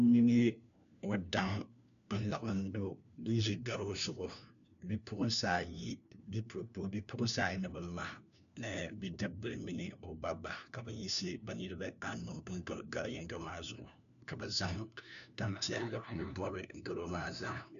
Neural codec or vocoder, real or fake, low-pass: codec, 16 kHz, 1 kbps, FunCodec, trained on LibriTTS, 50 frames a second; fake; 7.2 kHz